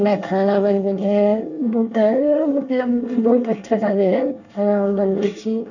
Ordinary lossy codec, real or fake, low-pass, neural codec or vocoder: none; fake; 7.2 kHz; codec, 24 kHz, 1 kbps, SNAC